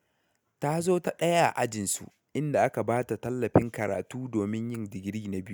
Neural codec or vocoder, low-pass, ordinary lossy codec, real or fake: none; none; none; real